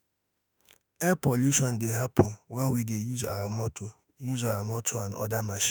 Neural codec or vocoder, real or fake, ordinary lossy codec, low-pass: autoencoder, 48 kHz, 32 numbers a frame, DAC-VAE, trained on Japanese speech; fake; none; none